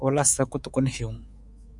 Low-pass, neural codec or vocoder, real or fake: 10.8 kHz; codec, 24 kHz, 3.1 kbps, DualCodec; fake